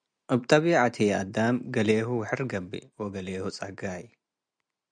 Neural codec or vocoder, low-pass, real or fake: none; 9.9 kHz; real